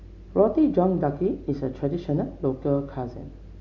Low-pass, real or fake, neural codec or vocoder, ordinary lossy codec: 7.2 kHz; real; none; MP3, 64 kbps